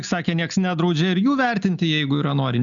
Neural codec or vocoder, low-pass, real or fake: none; 7.2 kHz; real